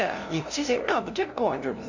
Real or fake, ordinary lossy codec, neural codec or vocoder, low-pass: fake; none; codec, 16 kHz, 0.5 kbps, FunCodec, trained on LibriTTS, 25 frames a second; 7.2 kHz